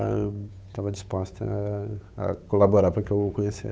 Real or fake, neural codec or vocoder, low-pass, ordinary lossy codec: fake; codec, 16 kHz, 8 kbps, FunCodec, trained on Chinese and English, 25 frames a second; none; none